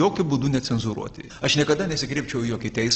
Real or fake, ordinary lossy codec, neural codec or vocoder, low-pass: real; Opus, 16 kbps; none; 7.2 kHz